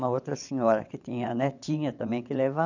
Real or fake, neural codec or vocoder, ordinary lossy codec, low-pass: fake; vocoder, 22.05 kHz, 80 mel bands, WaveNeXt; none; 7.2 kHz